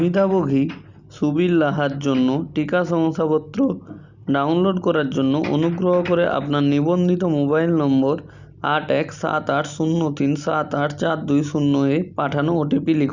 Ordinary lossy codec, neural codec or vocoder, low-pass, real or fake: Opus, 64 kbps; none; 7.2 kHz; real